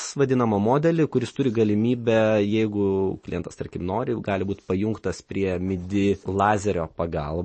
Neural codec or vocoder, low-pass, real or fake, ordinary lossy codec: none; 10.8 kHz; real; MP3, 32 kbps